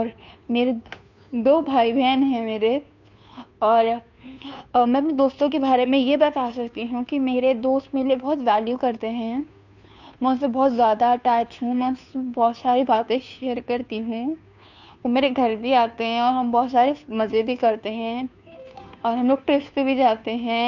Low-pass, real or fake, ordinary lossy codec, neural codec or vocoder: 7.2 kHz; fake; none; codec, 16 kHz, 2 kbps, FunCodec, trained on Chinese and English, 25 frames a second